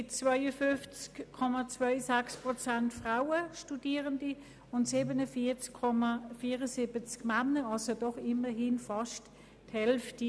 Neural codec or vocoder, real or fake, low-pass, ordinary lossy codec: none; real; none; none